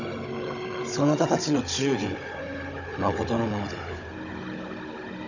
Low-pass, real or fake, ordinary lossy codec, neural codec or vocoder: 7.2 kHz; fake; none; codec, 16 kHz, 16 kbps, FunCodec, trained on Chinese and English, 50 frames a second